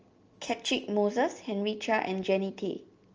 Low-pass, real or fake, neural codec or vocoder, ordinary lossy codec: 7.2 kHz; real; none; Opus, 24 kbps